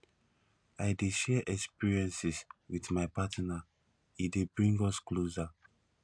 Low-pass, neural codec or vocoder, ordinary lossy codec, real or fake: 9.9 kHz; none; none; real